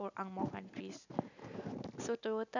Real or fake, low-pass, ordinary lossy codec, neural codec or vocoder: real; 7.2 kHz; none; none